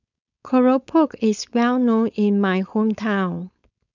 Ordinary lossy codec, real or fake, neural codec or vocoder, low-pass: none; fake; codec, 16 kHz, 4.8 kbps, FACodec; 7.2 kHz